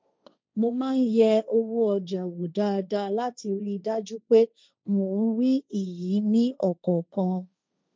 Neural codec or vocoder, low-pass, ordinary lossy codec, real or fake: codec, 16 kHz, 1.1 kbps, Voila-Tokenizer; none; none; fake